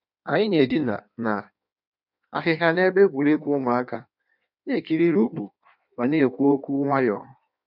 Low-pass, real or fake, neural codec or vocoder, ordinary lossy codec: 5.4 kHz; fake; codec, 16 kHz in and 24 kHz out, 1.1 kbps, FireRedTTS-2 codec; none